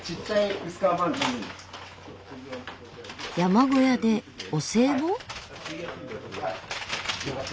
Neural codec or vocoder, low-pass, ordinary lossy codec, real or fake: none; none; none; real